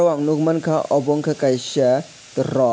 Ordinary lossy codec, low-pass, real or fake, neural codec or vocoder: none; none; real; none